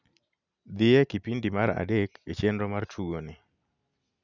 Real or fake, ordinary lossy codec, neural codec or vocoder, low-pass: real; none; none; 7.2 kHz